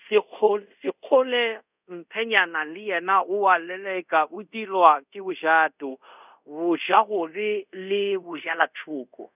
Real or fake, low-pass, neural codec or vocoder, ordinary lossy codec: fake; 3.6 kHz; codec, 24 kHz, 0.5 kbps, DualCodec; none